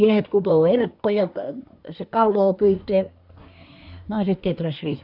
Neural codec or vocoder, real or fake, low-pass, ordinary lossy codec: codec, 24 kHz, 1 kbps, SNAC; fake; 5.4 kHz; none